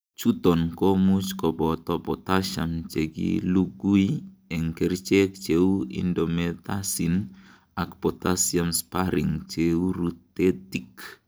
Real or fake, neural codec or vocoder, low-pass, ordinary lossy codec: real; none; none; none